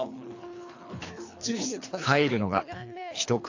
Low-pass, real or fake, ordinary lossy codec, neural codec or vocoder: 7.2 kHz; fake; AAC, 48 kbps; codec, 24 kHz, 3 kbps, HILCodec